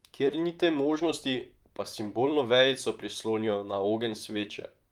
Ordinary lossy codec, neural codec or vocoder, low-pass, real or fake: Opus, 32 kbps; vocoder, 44.1 kHz, 128 mel bands, Pupu-Vocoder; 19.8 kHz; fake